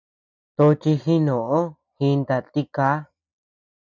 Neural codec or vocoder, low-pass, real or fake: none; 7.2 kHz; real